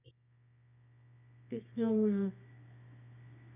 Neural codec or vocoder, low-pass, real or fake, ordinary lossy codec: codec, 24 kHz, 0.9 kbps, WavTokenizer, medium music audio release; 3.6 kHz; fake; none